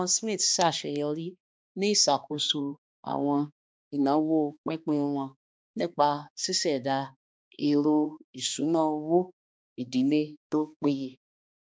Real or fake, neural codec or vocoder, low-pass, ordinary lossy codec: fake; codec, 16 kHz, 2 kbps, X-Codec, HuBERT features, trained on balanced general audio; none; none